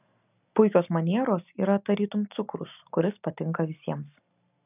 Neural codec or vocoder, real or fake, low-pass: none; real; 3.6 kHz